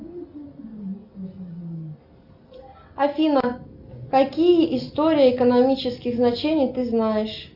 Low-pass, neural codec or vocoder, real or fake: 5.4 kHz; none; real